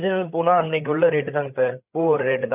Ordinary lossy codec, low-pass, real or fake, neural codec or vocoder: none; 3.6 kHz; fake; codec, 16 kHz, 8 kbps, FreqCodec, larger model